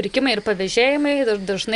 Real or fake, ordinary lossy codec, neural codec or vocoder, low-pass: real; Opus, 64 kbps; none; 10.8 kHz